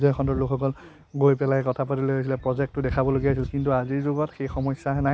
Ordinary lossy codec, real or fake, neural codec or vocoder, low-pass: none; real; none; none